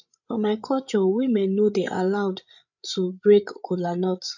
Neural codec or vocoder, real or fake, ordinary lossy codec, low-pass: codec, 16 kHz, 8 kbps, FreqCodec, larger model; fake; none; 7.2 kHz